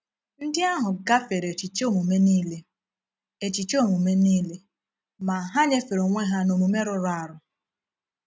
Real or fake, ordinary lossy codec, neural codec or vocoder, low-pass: real; none; none; none